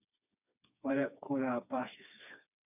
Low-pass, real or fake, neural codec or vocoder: 3.6 kHz; fake; codec, 16 kHz, 2 kbps, FreqCodec, smaller model